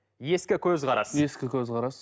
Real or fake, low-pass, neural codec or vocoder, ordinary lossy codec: real; none; none; none